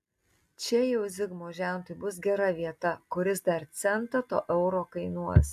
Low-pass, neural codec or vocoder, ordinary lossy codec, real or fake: 14.4 kHz; none; AAC, 96 kbps; real